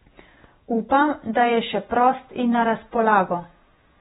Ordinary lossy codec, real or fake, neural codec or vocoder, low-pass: AAC, 16 kbps; fake; vocoder, 48 kHz, 128 mel bands, Vocos; 19.8 kHz